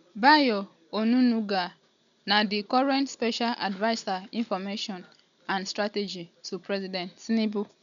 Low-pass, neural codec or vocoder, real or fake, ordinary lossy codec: 7.2 kHz; none; real; none